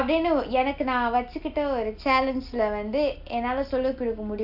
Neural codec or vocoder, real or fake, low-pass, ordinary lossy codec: none; real; 5.4 kHz; AAC, 48 kbps